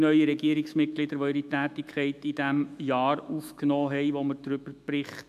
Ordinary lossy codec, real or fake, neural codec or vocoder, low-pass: none; fake; autoencoder, 48 kHz, 128 numbers a frame, DAC-VAE, trained on Japanese speech; 14.4 kHz